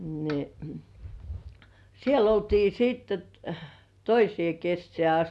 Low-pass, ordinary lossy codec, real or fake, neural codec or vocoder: none; none; real; none